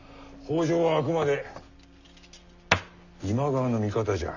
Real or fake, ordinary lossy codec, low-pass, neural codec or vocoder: real; none; 7.2 kHz; none